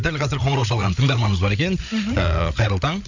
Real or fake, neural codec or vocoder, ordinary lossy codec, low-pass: fake; codec, 16 kHz, 8 kbps, FreqCodec, larger model; none; 7.2 kHz